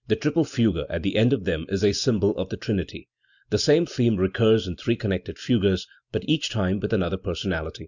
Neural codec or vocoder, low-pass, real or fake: none; 7.2 kHz; real